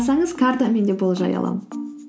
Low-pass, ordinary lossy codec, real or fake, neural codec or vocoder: none; none; real; none